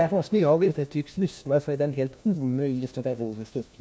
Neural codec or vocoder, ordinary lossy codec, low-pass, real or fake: codec, 16 kHz, 1 kbps, FunCodec, trained on LibriTTS, 50 frames a second; none; none; fake